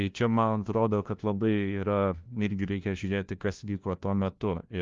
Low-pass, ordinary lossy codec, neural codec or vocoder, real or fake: 7.2 kHz; Opus, 32 kbps; codec, 16 kHz, 1 kbps, FunCodec, trained on LibriTTS, 50 frames a second; fake